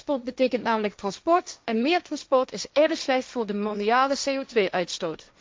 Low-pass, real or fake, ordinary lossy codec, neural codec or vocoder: none; fake; none; codec, 16 kHz, 1.1 kbps, Voila-Tokenizer